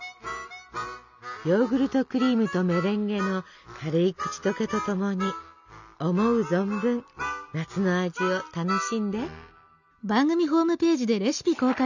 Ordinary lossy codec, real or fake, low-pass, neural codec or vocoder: none; real; 7.2 kHz; none